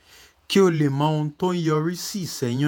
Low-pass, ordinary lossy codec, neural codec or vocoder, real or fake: none; none; vocoder, 48 kHz, 128 mel bands, Vocos; fake